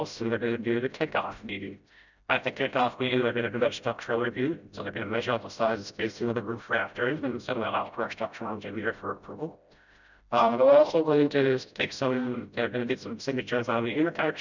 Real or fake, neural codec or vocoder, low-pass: fake; codec, 16 kHz, 0.5 kbps, FreqCodec, smaller model; 7.2 kHz